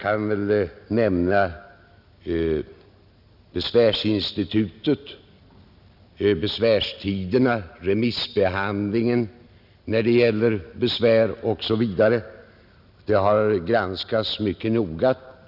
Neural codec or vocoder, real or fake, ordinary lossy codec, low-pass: none; real; none; 5.4 kHz